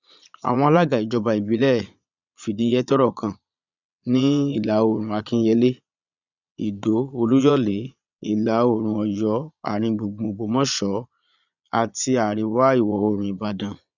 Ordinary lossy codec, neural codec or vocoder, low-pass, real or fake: none; vocoder, 22.05 kHz, 80 mel bands, Vocos; 7.2 kHz; fake